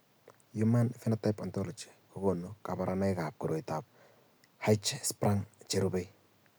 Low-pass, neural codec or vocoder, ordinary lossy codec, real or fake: none; none; none; real